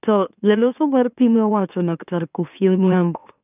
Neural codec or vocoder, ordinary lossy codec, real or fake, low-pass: autoencoder, 44.1 kHz, a latent of 192 numbers a frame, MeloTTS; none; fake; 3.6 kHz